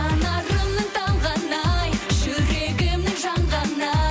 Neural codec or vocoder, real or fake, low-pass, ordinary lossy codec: none; real; none; none